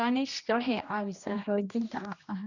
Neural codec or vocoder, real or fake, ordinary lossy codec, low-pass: codec, 16 kHz, 1 kbps, X-Codec, HuBERT features, trained on general audio; fake; none; 7.2 kHz